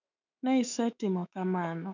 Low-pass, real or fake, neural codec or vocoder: 7.2 kHz; fake; autoencoder, 48 kHz, 128 numbers a frame, DAC-VAE, trained on Japanese speech